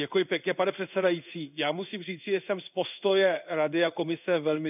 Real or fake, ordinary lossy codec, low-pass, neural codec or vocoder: fake; none; 3.6 kHz; codec, 16 kHz in and 24 kHz out, 1 kbps, XY-Tokenizer